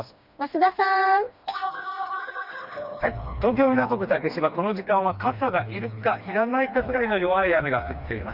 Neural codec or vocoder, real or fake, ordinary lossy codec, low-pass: codec, 16 kHz, 2 kbps, FreqCodec, smaller model; fake; Opus, 64 kbps; 5.4 kHz